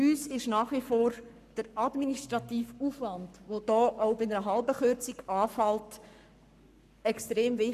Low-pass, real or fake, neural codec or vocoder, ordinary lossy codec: 14.4 kHz; fake; codec, 44.1 kHz, 7.8 kbps, Pupu-Codec; none